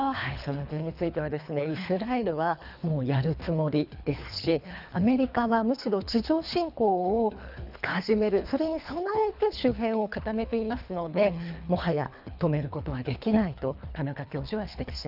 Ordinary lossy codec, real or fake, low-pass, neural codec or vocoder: none; fake; 5.4 kHz; codec, 24 kHz, 3 kbps, HILCodec